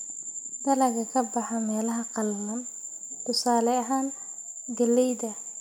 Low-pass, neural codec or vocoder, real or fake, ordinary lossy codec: none; none; real; none